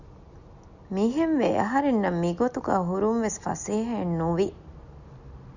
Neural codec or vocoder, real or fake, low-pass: none; real; 7.2 kHz